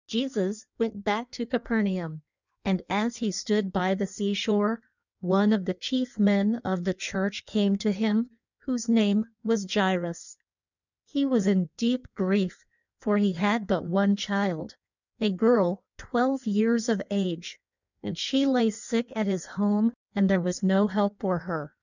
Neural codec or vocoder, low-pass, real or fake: codec, 16 kHz in and 24 kHz out, 1.1 kbps, FireRedTTS-2 codec; 7.2 kHz; fake